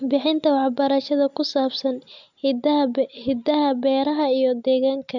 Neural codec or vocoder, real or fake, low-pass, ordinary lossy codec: none; real; 7.2 kHz; none